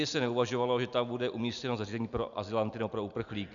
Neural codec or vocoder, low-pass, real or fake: none; 7.2 kHz; real